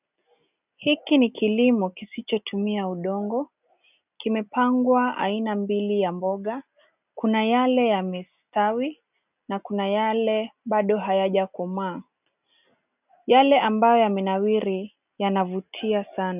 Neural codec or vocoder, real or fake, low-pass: none; real; 3.6 kHz